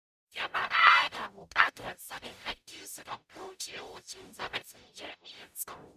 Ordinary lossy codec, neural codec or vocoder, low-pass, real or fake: none; codec, 44.1 kHz, 0.9 kbps, DAC; 14.4 kHz; fake